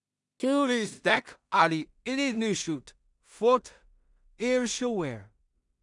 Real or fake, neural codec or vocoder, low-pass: fake; codec, 16 kHz in and 24 kHz out, 0.4 kbps, LongCat-Audio-Codec, two codebook decoder; 10.8 kHz